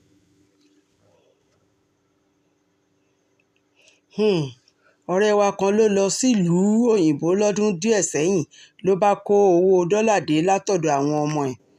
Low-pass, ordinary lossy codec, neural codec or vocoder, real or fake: 14.4 kHz; none; none; real